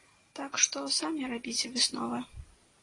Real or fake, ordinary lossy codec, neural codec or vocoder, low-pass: real; AAC, 32 kbps; none; 10.8 kHz